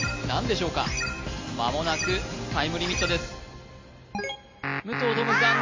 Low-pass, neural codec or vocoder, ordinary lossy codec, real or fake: 7.2 kHz; none; MP3, 32 kbps; real